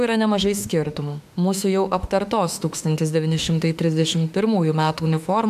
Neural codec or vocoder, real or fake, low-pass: autoencoder, 48 kHz, 32 numbers a frame, DAC-VAE, trained on Japanese speech; fake; 14.4 kHz